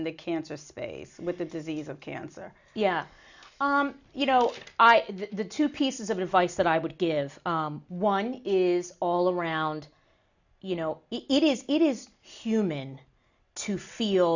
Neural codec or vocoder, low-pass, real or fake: none; 7.2 kHz; real